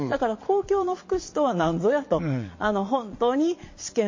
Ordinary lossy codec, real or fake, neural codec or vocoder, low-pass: MP3, 32 kbps; fake; vocoder, 22.05 kHz, 80 mel bands, Vocos; 7.2 kHz